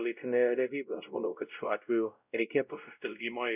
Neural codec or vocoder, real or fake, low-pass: codec, 16 kHz, 0.5 kbps, X-Codec, WavLM features, trained on Multilingual LibriSpeech; fake; 3.6 kHz